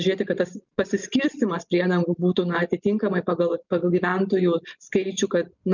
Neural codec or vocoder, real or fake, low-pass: none; real; 7.2 kHz